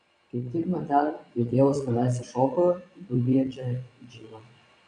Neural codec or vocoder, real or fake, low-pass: vocoder, 22.05 kHz, 80 mel bands, Vocos; fake; 9.9 kHz